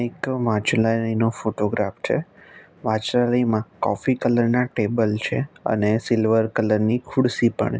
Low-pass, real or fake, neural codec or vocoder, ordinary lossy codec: none; real; none; none